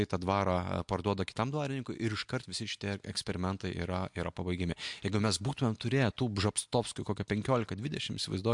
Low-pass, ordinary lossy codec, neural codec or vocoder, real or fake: 10.8 kHz; MP3, 64 kbps; none; real